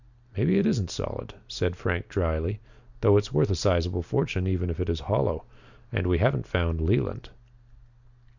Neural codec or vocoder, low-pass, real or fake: none; 7.2 kHz; real